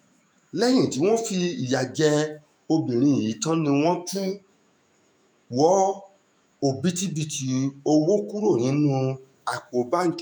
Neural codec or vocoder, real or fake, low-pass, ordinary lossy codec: autoencoder, 48 kHz, 128 numbers a frame, DAC-VAE, trained on Japanese speech; fake; none; none